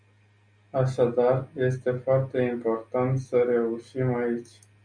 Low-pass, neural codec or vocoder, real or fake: 9.9 kHz; none; real